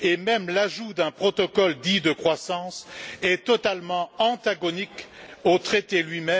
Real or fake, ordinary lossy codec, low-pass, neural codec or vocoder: real; none; none; none